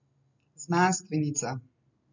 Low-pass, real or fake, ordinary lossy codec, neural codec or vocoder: 7.2 kHz; real; none; none